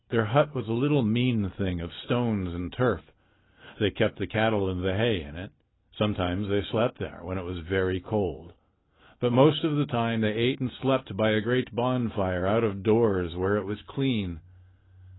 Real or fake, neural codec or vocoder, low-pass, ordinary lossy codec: real; none; 7.2 kHz; AAC, 16 kbps